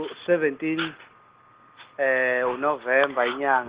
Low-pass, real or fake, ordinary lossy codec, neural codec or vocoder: 3.6 kHz; real; Opus, 16 kbps; none